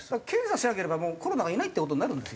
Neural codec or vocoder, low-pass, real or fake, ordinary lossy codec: none; none; real; none